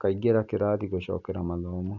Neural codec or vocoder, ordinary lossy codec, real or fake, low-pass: none; none; real; 7.2 kHz